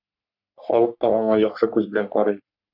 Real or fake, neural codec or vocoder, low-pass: fake; codec, 44.1 kHz, 3.4 kbps, Pupu-Codec; 5.4 kHz